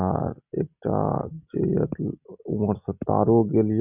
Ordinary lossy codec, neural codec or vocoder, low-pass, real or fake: none; none; 3.6 kHz; real